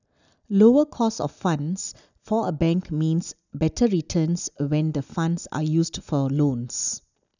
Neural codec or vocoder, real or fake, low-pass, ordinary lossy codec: none; real; 7.2 kHz; none